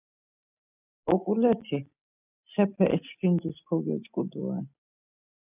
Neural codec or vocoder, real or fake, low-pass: none; real; 3.6 kHz